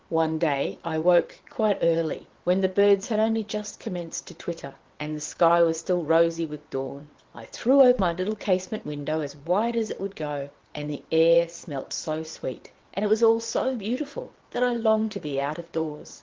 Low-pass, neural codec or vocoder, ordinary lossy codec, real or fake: 7.2 kHz; vocoder, 22.05 kHz, 80 mel bands, WaveNeXt; Opus, 16 kbps; fake